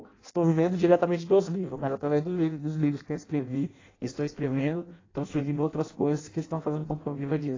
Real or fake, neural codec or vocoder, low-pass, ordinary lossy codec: fake; codec, 16 kHz in and 24 kHz out, 0.6 kbps, FireRedTTS-2 codec; 7.2 kHz; AAC, 32 kbps